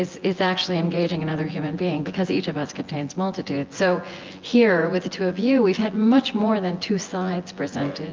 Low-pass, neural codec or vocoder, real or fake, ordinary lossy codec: 7.2 kHz; vocoder, 24 kHz, 100 mel bands, Vocos; fake; Opus, 32 kbps